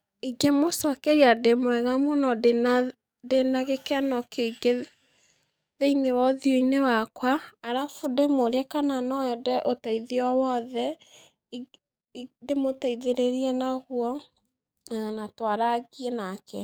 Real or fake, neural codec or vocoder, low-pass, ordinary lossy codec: fake; codec, 44.1 kHz, 7.8 kbps, DAC; none; none